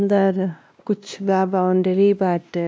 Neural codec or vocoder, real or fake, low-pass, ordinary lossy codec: codec, 16 kHz, 1 kbps, X-Codec, WavLM features, trained on Multilingual LibriSpeech; fake; none; none